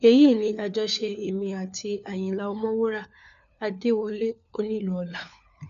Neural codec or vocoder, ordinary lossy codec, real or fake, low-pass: codec, 16 kHz, 4 kbps, FunCodec, trained on LibriTTS, 50 frames a second; none; fake; 7.2 kHz